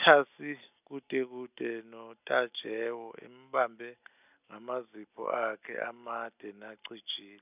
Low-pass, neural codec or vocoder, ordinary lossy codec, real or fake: 3.6 kHz; none; none; real